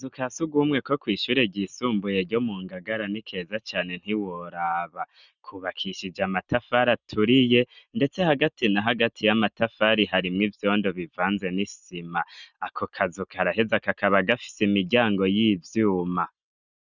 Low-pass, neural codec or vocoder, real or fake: 7.2 kHz; none; real